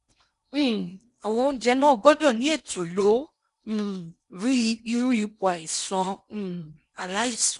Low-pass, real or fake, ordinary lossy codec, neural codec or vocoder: 10.8 kHz; fake; none; codec, 16 kHz in and 24 kHz out, 0.8 kbps, FocalCodec, streaming, 65536 codes